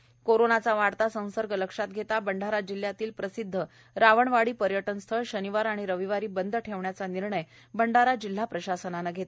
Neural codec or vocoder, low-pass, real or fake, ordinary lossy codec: none; none; real; none